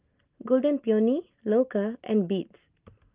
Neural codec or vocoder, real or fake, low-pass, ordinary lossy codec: none; real; 3.6 kHz; Opus, 32 kbps